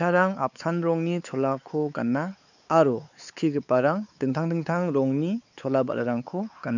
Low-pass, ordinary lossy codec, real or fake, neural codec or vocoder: 7.2 kHz; none; fake; codec, 16 kHz, 4 kbps, X-Codec, WavLM features, trained on Multilingual LibriSpeech